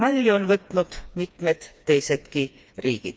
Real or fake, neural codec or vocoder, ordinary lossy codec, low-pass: fake; codec, 16 kHz, 2 kbps, FreqCodec, smaller model; none; none